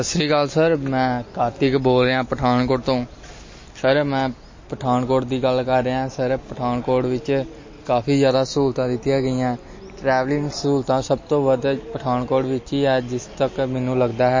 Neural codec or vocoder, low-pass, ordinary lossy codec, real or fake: none; 7.2 kHz; MP3, 32 kbps; real